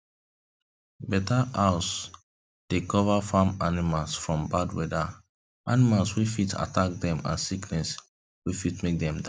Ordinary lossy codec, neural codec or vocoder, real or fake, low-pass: none; none; real; none